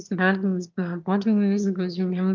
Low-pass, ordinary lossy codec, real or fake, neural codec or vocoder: 7.2 kHz; Opus, 32 kbps; fake; autoencoder, 22.05 kHz, a latent of 192 numbers a frame, VITS, trained on one speaker